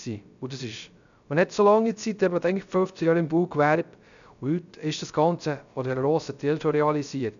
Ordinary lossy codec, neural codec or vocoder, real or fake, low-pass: none; codec, 16 kHz, 0.3 kbps, FocalCodec; fake; 7.2 kHz